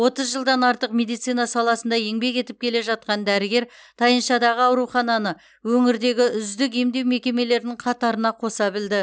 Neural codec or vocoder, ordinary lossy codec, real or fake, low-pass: none; none; real; none